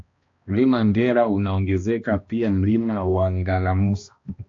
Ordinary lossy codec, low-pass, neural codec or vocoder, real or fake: AAC, 48 kbps; 7.2 kHz; codec, 16 kHz, 1 kbps, X-Codec, HuBERT features, trained on balanced general audio; fake